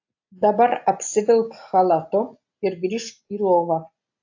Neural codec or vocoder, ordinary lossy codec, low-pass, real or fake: none; AAC, 48 kbps; 7.2 kHz; real